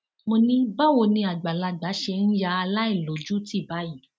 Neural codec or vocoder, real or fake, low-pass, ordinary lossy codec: none; real; none; none